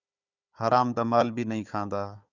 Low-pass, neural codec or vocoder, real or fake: 7.2 kHz; codec, 16 kHz, 4 kbps, FunCodec, trained on Chinese and English, 50 frames a second; fake